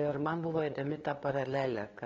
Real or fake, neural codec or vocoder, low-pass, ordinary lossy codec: fake; codec, 16 kHz, 2 kbps, FunCodec, trained on LibriTTS, 25 frames a second; 7.2 kHz; AAC, 32 kbps